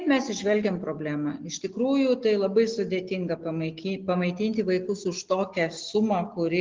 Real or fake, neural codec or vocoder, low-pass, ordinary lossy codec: real; none; 7.2 kHz; Opus, 16 kbps